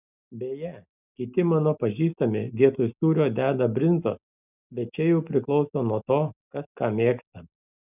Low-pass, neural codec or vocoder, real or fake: 3.6 kHz; none; real